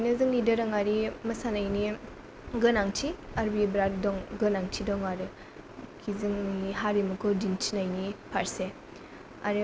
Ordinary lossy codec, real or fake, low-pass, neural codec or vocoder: none; real; none; none